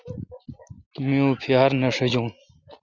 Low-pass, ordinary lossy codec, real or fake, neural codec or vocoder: 7.2 kHz; Opus, 64 kbps; real; none